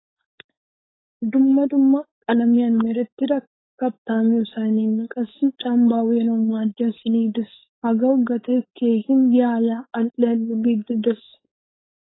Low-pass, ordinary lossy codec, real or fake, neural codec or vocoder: 7.2 kHz; AAC, 16 kbps; fake; codec, 16 kHz, 4.8 kbps, FACodec